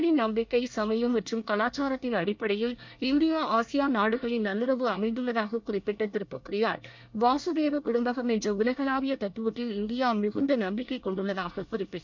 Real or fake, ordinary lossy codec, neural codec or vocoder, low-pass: fake; MP3, 64 kbps; codec, 24 kHz, 1 kbps, SNAC; 7.2 kHz